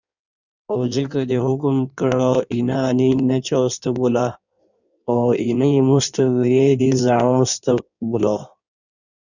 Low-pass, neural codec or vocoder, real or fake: 7.2 kHz; codec, 16 kHz in and 24 kHz out, 1.1 kbps, FireRedTTS-2 codec; fake